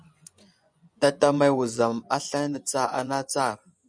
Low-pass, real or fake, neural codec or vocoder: 9.9 kHz; fake; vocoder, 24 kHz, 100 mel bands, Vocos